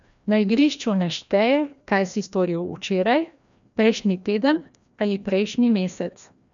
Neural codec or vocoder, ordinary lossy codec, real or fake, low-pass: codec, 16 kHz, 1 kbps, FreqCodec, larger model; none; fake; 7.2 kHz